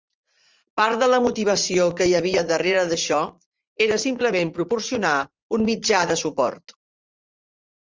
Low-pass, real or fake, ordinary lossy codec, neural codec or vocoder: 7.2 kHz; fake; Opus, 32 kbps; vocoder, 44.1 kHz, 80 mel bands, Vocos